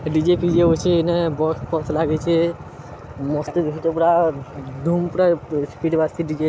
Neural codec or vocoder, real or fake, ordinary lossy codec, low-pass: none; real; none; none